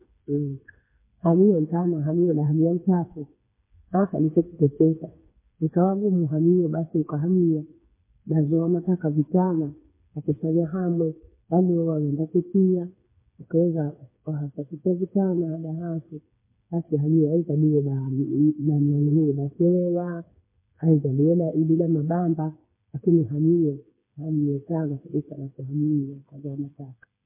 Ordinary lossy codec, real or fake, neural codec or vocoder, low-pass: MP3, 24 kbps; fake; codec, 16 kHz, 4 kbps, FreqCodec, smaller model; 3.6 kHz